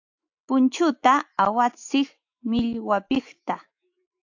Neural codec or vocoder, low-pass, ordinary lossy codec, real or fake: autoencoder, 48 kHz, 128 numbers a frame, DAC-VAE, trained on Japanese speech; 7.2 kHz; AAC, 48 kbps; fake